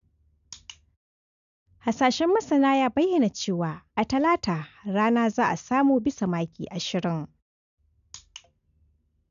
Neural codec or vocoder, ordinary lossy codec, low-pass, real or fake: none; none; 7.2 kHz; real